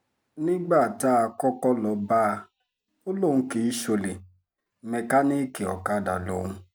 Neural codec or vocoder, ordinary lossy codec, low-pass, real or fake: none; none; none; real